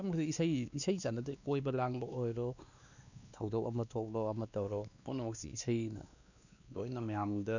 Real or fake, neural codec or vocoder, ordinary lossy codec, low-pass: fake; codec, 16 kHz, 2 kbps, X-Codec, WavLM features, trained on Multilingual LibriSpeech; none; 7.2 kHz